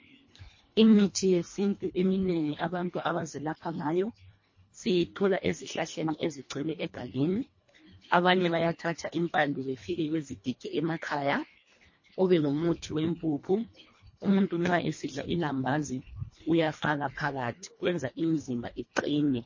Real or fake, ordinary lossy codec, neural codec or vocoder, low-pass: fake; MP3, 32 kbps; codec, 24 kHz, 1.5 kbps, HILCodec; 7.2 kHz